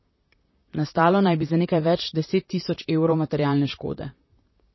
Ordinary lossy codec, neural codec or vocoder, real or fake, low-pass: MP3, 24 kbps; vocoder, 44.1 kHz, 128 mel bands, Pupu-Vocoder; fake; 7.2 kHz